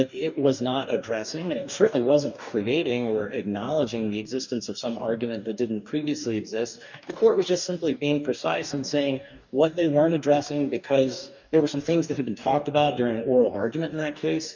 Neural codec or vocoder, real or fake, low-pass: codec, 44.1 kHz, 2.6 kbps, DAC; fake; 7.2 kHz